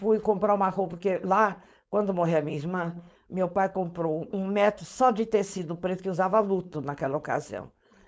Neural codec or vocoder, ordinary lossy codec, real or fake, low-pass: codec, 16 kHz, 4.8 kbps, FACodec; none; fake; none